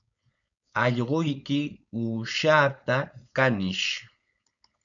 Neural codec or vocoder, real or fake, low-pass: codec, 16 kHz, 4.8 kbps, FACodec; fake; 7.2 kHz